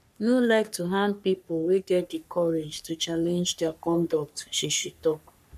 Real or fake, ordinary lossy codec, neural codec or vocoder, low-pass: fake; none; codec, 44.1 kHz, 3.4 kbps, Pupu-Codec; 14.4 kHz